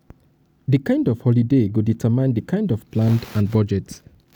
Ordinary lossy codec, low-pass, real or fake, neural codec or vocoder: none; none; real; none